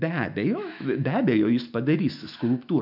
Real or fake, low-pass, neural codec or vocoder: fake; 5.4 kHz; autoencoder, 48 kHz, 128 numbers a frame, DAC-VAE, trained on Japanese speech